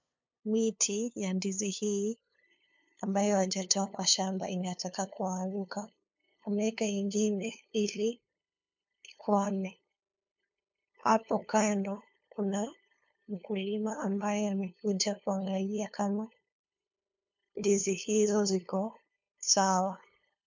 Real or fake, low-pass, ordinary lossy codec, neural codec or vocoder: fake; 7.2 kHz; MP3, 64 kbps; codec, 16 kHz, 2 kbps, FunCodec, trained on LibriTTS, 25 frames a second